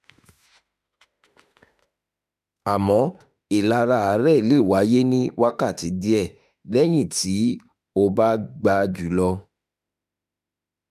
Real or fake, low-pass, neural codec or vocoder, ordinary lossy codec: fake; 14.4 kHz; autoencoder, 48 kHz, 32 numbers a frame, DAC-VAE, trained on Japanese speech; none